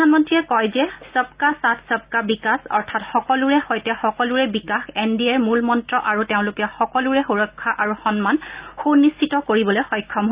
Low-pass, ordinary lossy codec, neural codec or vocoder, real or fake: 3.6 kHz; Opus, 64 kbps; none; real